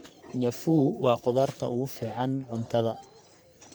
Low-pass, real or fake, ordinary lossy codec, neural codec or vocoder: none; fake; none; codec, 44.1 kHz, 3.4 kbps, Pupu-Codec